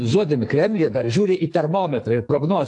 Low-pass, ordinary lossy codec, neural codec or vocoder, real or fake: 10.8 kHz; AAC, 64 kbps; codec, 44.1 kHz, 2.6 kbps, SNAC; fake